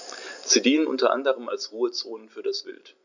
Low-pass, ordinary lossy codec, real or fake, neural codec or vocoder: 7.2 kHz; none; real; none